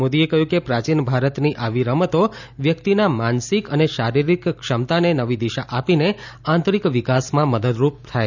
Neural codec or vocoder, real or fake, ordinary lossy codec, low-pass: none; real; none; 7.2 kHz